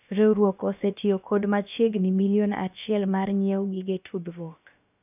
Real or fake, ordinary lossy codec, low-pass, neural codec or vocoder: fake; none; 3.6 kHz; codec, 16 kHz, about 1 kbps, DyCAST, with the encoder's durations